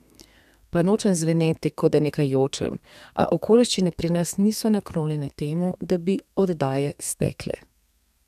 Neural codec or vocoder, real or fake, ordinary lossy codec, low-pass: codec, 32 kHz, 1.9 kbps, SNAC; fake; none; 14.4 kHz